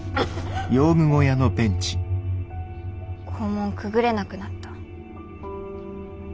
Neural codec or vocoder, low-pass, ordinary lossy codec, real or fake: none; none; none; real